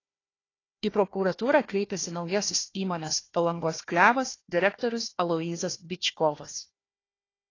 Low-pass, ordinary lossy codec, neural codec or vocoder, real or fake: 7.2 kHz; AAC, 32 kbps; codec, 16 kHz, 1 kbps, FunCodec, trained on Chinese and English, 50 frames a second; fake